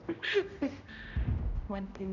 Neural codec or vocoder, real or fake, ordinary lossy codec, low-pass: codec, 16 kHz, 0.5 kbps, X-Codec, HuBERT features, trained on general audio; fake; none; 7.2 kHz